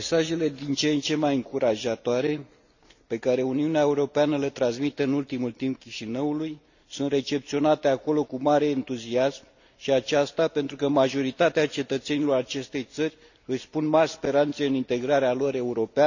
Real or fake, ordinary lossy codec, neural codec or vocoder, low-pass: real; none; none; 7.2 kHz